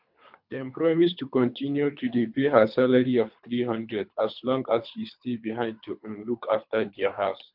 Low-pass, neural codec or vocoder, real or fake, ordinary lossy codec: 5.4 kHz; codec, 24 kHz, 3 kbps, HILCodec; fake; MP3, 48 kbps